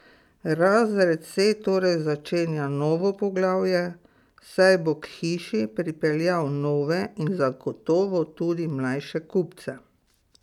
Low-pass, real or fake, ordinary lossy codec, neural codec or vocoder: 19.8 kHz; real; none; none